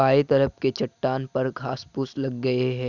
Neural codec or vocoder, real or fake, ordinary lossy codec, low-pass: none; real; none; 7.2 kHz